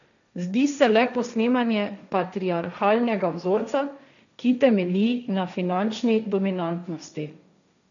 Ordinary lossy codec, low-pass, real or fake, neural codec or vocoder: none; 7.2 kHz; fake; codec, 16 kHz, 1.1 kbps, Voila-Tokenizer